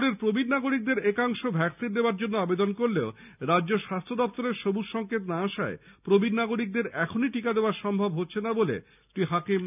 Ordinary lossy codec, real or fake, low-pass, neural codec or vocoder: none; real; 3.6 kHz; none